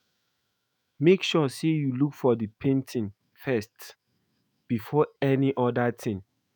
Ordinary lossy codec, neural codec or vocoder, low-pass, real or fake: none; autoencoder, 48 kHz, 128 numbers a frame, DAC-VAE, trained on Japanese speech; none; fake